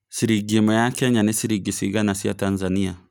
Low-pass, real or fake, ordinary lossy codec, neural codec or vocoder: none; real; none; none